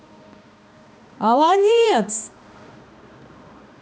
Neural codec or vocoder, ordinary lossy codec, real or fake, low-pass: codec, 16 kHz, 2 kbps, X-Codec, HuBERT features, trained on balanced general audio; none; fake; none